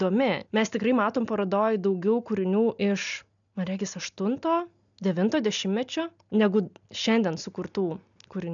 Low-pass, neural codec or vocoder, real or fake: 7.2 kHz; none; real